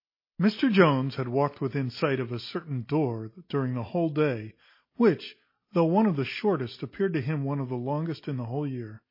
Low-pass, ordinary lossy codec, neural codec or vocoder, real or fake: 5.4 kHz; MP3, 24 kbps; none; real